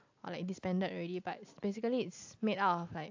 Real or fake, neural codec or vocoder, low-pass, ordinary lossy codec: real; none; 7.2 kHz; AAC, 48 kbps